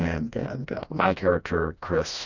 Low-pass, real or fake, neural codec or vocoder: 7.2 kHz; fake; codec, 16 kHz, 1 kbps, FreqCodec, smaller model